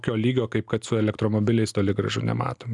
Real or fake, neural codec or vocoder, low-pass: real; none; 10.8 kHz